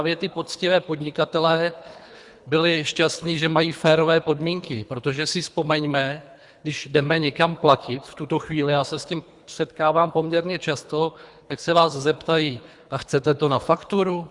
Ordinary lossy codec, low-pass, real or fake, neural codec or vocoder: Opus, 64 kbps; 10.8 kHz; fake; codec, 24 kHz, 3 kbps, HILCodec